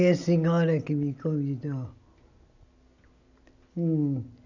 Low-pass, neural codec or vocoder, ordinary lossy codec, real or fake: 7.2 kHz; none; none; real